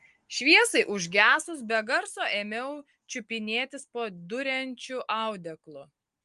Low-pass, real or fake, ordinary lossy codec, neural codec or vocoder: 10.8 kHz; real; Opus, 32 kbps; none